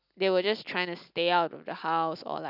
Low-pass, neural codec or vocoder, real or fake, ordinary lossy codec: 5.4 kHz; none; real; none